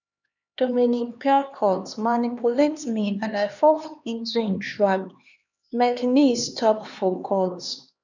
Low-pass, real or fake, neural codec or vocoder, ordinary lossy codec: 7.2 kHz; fake; codec, 16 kHz, 2 kbps, X-Codec, HuBERT features, trained on LibriSpeech; none